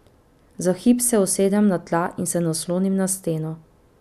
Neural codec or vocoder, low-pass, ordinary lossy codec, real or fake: none; 14.4 kHz; none; real